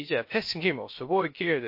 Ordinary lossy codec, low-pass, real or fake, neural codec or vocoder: MP3, 32 kbps; 5.4 kHz; fake; codec, 16 kHz, 0.3 kbps, FocalCodec